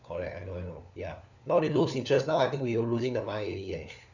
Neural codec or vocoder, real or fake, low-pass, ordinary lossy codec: codec, 16 kHz, 4 kbps, FunCodec, trained on LibriTTS, 50 frames a second; fake; 7.2 kHz; none